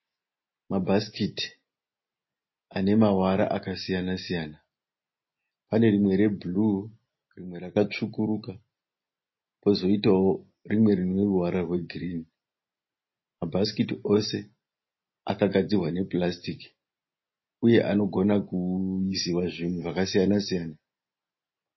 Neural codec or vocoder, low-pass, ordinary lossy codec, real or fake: none; 7.2 kHz; MP3, 24 kbps; real